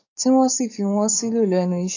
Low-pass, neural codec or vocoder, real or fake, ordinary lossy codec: 7.2 kHz; codec, 44.1 kHz, 7.8 kbps, DAC; fake; AAC, 48 kbps